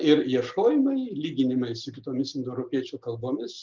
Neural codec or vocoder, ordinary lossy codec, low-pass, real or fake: none; Opus, 24 kbps; 7.2 kHz; real